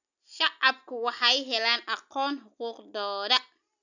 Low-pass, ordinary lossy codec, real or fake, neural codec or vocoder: 7.2 kHz; none; real; none